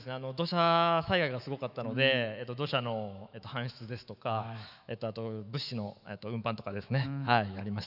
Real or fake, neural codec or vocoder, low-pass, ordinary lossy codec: fake; autoencoder, 48 kHz, 128 numbers a frame, DAC-VAE, trained on Japanese speech; 5.4 kHz; none